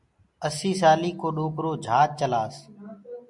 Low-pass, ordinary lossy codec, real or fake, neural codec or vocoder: 10.8 kHz; MP3, 64 kbps; real; none